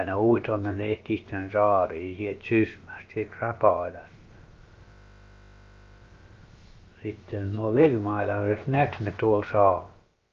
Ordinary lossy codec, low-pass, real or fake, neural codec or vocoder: Opus, 32 kbps; 7.2 kHz; fake; codec, 16 kHz, about 1 kbps, DyCAST, with the encoder's durations